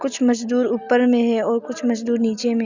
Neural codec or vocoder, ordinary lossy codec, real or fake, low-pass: none; Opus, 64 kbps; real; 7.2 kHz